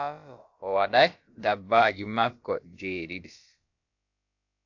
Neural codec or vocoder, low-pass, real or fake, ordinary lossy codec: codec, 16 kHz, about 1 kbps, DyCAST, with the encoder's durations; 7.2 kHz; fake; AAC, 48 kbps